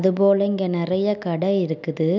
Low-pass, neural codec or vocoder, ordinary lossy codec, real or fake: 7.2 kHz; none; none; real